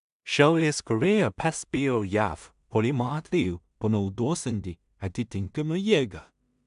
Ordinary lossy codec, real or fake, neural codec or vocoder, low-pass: MP3, 96 kbps; fake; codec, 16 kHz in and 24 kHz out, 0.4 kbps, LongCat-Audio-Codec, two codebook decoder; 10.8 kHz